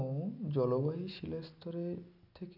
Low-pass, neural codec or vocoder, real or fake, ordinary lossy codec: 5.4 kHz; none; real; none